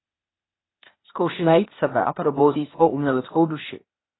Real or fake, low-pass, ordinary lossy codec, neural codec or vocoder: fake; 7.2 kHz; AAC, 16 kbps; codec, 16 kHz, 0.8 kbps, ZipCodec